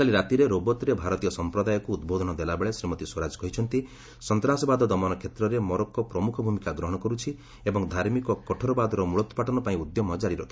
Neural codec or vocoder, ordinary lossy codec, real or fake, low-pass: none; none; real; none